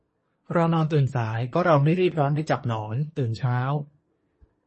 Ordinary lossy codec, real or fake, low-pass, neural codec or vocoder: MP3, 32 kbps; fake; 10.8 kHz; codec, 24 kHz, 1 kbps, SNAC